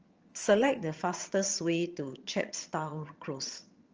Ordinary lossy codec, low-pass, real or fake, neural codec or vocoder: Opus, 24 kbps; 7.2 kHz; fake; vocoder, 22.05 kHz, 80 mel bands, HiFi-GAN